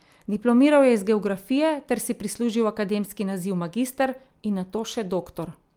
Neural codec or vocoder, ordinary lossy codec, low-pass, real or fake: none; Opus, 32 kbps; 19.8 kHz; real